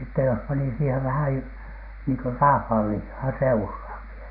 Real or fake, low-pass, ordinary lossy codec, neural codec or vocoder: real; 5.4 kHz; none; none